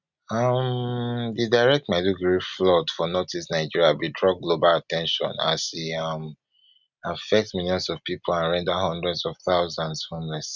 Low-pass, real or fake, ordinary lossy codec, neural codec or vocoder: 7.2 kHz; real; none; none